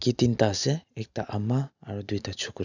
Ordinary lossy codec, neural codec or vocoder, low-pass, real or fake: none; none; 7.2 kHz; real